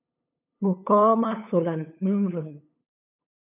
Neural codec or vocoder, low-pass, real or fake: codec, 16 kHz, 8 kbps, FunCodec, trained on LibriTTS, 25 frames a second; 3.6 kHz; fake